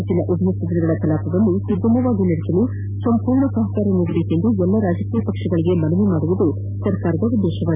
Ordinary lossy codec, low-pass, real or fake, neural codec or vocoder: none; 3.6 kHz; real; none